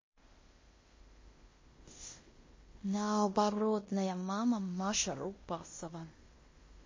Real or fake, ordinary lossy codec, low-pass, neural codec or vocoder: fake; MP3, 32 kbps; 7.2 kHz; codec, 16 kHz in and 24 kHz out, 0.9 kbps, LongCat-Audio-Codec, fine tuned four codebook decoder